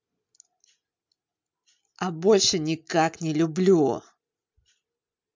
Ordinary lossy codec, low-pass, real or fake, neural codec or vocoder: MP3, 64 kbps; 7.2 kHz; real; none